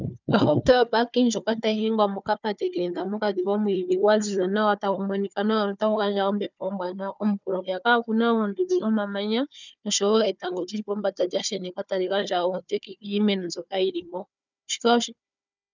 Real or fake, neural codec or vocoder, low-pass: fake; codec, 16 kHz, 4 kbps, FunCodec, trained on Chinese and English, 50 frames a second; 7.2 kHz